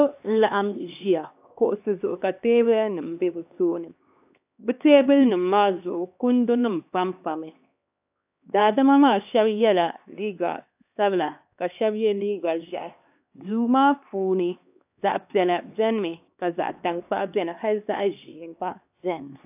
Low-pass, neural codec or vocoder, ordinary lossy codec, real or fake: 3.6 kHz; codec, 16 kHz, 2 kbps, X-Codec, HuBERT features, trained on LibriSpeech; AAC, 32 kbps; fake